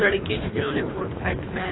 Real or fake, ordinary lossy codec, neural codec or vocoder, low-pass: fake; AAC, 16 kbps; codec, 24 kHz, 3 kbps, HILCodec; 7.2 kHz